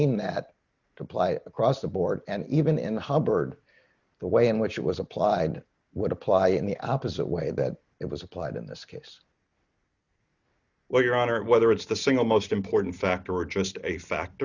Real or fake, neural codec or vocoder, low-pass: real; none; 7.2 kHz